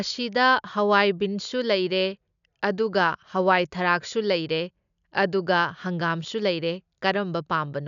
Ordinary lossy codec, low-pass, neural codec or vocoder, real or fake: none; 7.2 kHz; none; real